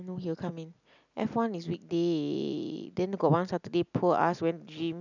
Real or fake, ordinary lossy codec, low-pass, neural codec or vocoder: real; none; 7.2 kHz; none